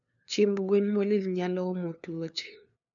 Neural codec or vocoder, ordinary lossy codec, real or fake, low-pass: codec, 16 kHz, 2 kbps, FunCodec, trained on LibriTTS, 25 frames a second; none; fake; 7.2 kHz